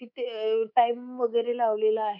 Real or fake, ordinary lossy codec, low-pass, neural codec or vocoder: fake; none; 5.4 kHz; codec, 24 kHz, 3.1 kbps, DualCodec